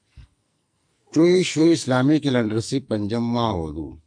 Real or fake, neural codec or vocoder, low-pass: fake; codec, 44.1 kHz, 2.6 kbps, SNAC; 9.9 kHz